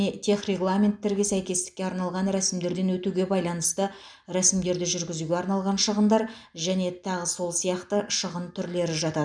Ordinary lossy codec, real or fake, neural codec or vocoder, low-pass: none; real; none; 9.9 kHz